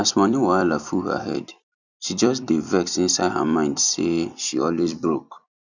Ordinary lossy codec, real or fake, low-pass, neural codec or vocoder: none; fake; 7.2 kHz; vocoder, 44.1 kHz, 128 mel bands every 256 samples, BigVGAN v2